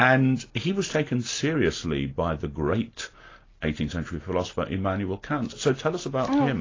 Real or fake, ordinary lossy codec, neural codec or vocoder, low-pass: real; AAC, 32 kbps; none; 7.2 kHz